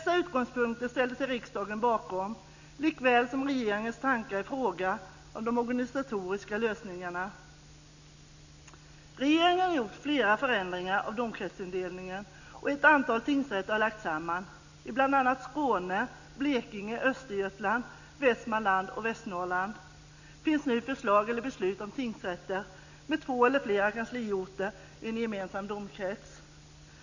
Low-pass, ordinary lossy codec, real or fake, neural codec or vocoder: 7.2 kHz; none; real; none